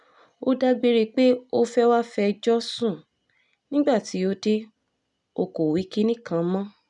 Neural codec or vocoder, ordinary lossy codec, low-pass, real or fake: none; none; 10.8 kHz; real